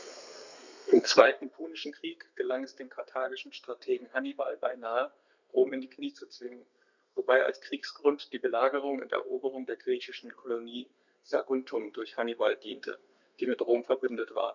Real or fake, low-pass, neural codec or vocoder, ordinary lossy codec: fake; 7.2 kHz; codec, 32 kHz, 1.9 kbps, SNAC; none